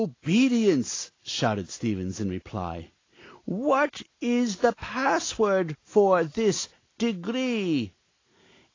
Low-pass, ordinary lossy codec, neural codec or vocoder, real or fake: 7.2 kHz; AAC, 32 kbps; none; real